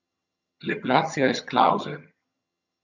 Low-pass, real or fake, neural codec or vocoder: 7.2 kHz; fake; vocoder, 22.05 kHz, 80 mel bands, HiFi-GAN